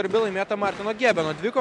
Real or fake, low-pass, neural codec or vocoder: real; 10.8 kHz; none